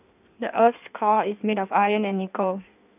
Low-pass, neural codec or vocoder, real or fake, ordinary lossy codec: 3.6 kHz; codec, 16 kHz in and 24 kHz out, 1.1 kbps, FireRedTTS-2 codec; fake; none